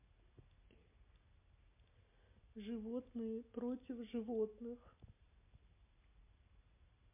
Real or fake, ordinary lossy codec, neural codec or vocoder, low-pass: real; MP3, 24 kbps; none; 3.6 kHz